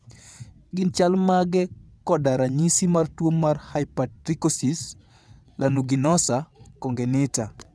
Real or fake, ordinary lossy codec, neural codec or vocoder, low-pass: fake; none; vocoder, 22.05 kHz, 80 mel bands, WaveNeXt; none